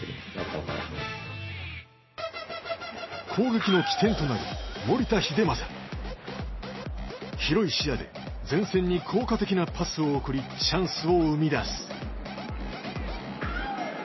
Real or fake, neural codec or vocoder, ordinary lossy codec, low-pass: real; none; MP3, 24 kbps; 7.2 kHz